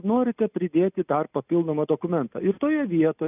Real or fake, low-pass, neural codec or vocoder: real; 3.6 kHz; none